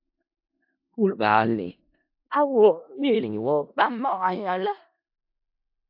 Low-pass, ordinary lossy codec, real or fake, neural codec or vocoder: 5.4 kHz; AAC, 48 kbps; fake; codec, 16 kHz in and 24 kHz out, 0.4 kbps, LongCat-Audio-Codec, four codebook decoder